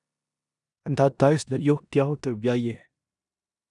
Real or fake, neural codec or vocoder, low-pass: fake; codec, 16 kHz in and 24 kHz out, 0.9 kbps, LongCat-Audio-Codec, four codebook decoder; 10.8 kHz